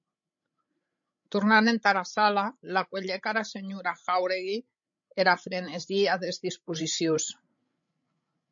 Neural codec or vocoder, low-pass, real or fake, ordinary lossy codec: codec, 16 kHz, 16 kbps, FreqCodec, larger model; 7.2 kHz; fake; MP3, 48 kbps